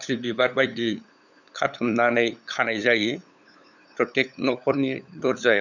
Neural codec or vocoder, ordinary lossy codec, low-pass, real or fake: codec, 16 kHz, 8 kbps, FunCodec, trained on LibriTTS, 25 frames a second; none; 7.2 kHz; fake